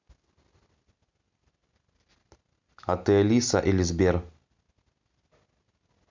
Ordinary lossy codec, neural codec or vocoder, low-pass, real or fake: MP3, 64 kbps; none; 7.2 kHz; real